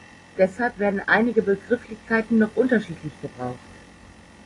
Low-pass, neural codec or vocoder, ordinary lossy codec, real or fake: 10.8 kHz; none; AAC, 32 kbps; real